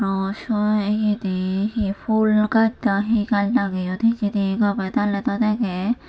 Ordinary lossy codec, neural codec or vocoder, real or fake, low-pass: none; none; real; none